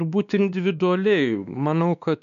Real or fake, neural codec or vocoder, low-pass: fake; codec, 16 kHz, 2 kbps, X-Codec, WavLM features, trained on Multilingual LibriSpeech; 7.2 kHz